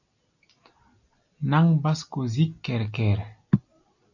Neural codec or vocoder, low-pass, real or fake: none; 7.2 kHz; real